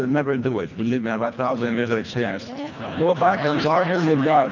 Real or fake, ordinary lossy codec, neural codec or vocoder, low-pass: fake; MP3, 64 kbps; codec, 24 kHz, 1.5 kbps, HILCodec; 7.2 kHz